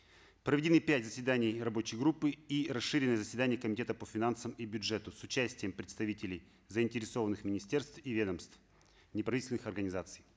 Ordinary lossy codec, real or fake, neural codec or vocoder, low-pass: none; real; none; none